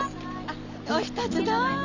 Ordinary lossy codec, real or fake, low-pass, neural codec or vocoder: none; real; 7.2 kHz; none